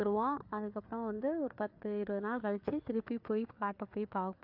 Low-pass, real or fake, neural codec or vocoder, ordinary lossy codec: 5.4 kHz; fake; codec, 16 kHz, 4 kbps, FreqCodec, larger model; AAC, 48 kbps